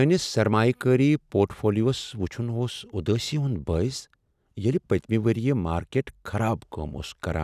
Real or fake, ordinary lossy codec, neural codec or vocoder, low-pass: real; none; none; 14.4 kHz